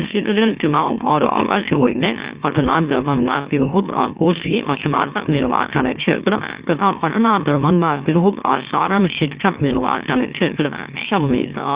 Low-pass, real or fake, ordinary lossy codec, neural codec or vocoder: 3.6 kHz; fake; Opus, 64 kbps; autoencoder, 44.1 kHz, a latent of 192 numbers a frame, MeloTTS